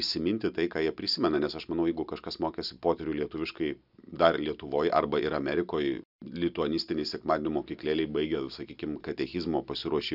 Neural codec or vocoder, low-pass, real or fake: none; 5.4 kHz; real